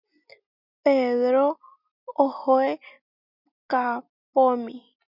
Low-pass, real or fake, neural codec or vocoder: 5.4 kHz; real; none